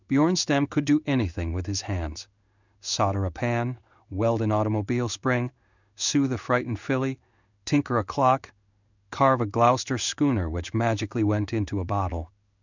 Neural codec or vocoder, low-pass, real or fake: codec, 16 kHz in and 24 kHz out, 1 kbps, XY-Tokenizer; 7.2 kHz; fake